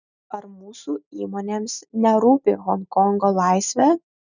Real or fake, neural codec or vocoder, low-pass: real; none; 7.2 kHz